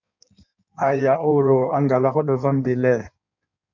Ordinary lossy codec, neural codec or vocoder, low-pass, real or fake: MP3, 64 kbps; codec, 16 kHz in and 24 kHz out, 1.1 kbps, FireRedTTS-2 codec; 7.2 kHz; fake